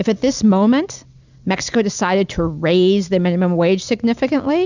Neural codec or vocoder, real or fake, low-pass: none; real; 7.2 kHz